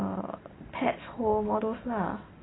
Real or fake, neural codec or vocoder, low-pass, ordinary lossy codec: real; none; 7.2 kHz; AAC, 16 kbps